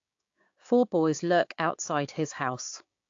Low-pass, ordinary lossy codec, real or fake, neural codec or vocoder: 7.2 kHz; AAC, 48 kbps; fake; codec, 16 kHz, 6 kbps, DAC